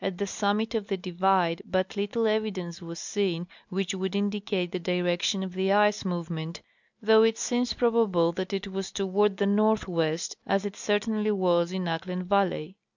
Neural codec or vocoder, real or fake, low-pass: none; real; 7.2 kHz